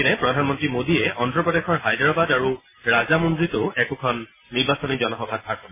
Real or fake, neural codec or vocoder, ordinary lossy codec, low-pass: real; none; MP3, 16 kbps; 3.6 kHz